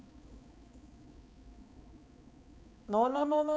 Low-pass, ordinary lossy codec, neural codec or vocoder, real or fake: none; none; codec, 16 kHz, 4 kbps, X-Codec, HuBERT features, trained on balanced general audio; fake